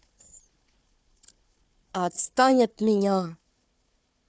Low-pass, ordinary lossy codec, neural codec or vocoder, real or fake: none; none; codec, 16 kHz, 4 kbps, FunCodec, trained on Chinese and English, 50 frames a second; fake